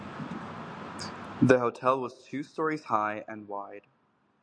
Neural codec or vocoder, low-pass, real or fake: none; 9.9 kHz; real